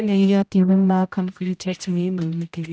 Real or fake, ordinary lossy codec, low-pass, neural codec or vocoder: fake; none; none; codec, 16 kHz, 0.5 kbps, X-Codec, HuBERT features, trained on general audio